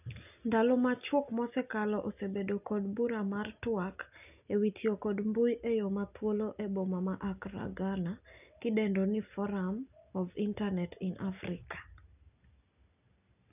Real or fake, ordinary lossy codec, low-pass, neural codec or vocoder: real; none; 3.6 kHz; none